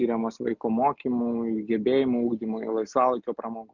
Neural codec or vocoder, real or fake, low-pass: none; real; 7.2 kHz